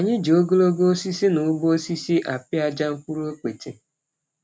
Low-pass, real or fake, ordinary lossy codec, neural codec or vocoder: none; real; none; none